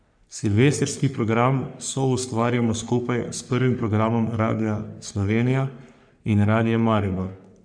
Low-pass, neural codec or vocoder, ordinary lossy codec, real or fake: 9.9 kHz; codec, 44.1 kHz, 3.4 kbps, Pupu-Codec; none; fake